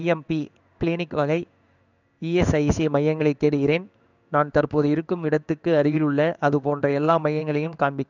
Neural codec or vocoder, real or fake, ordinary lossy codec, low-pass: codec, 16 kHz in and 24 kHz out, 1 kbps, XY-Tokenizer; fake; none; 7.2 kHz